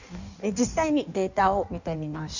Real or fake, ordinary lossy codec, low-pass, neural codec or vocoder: fake; none; 7.2 kHz; codec, 16 kHz in and 24 kHz out, 1.1 kbps, FireRedTTS-2 codec